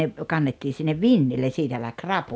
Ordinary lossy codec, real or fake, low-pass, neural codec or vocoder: none; real; none; none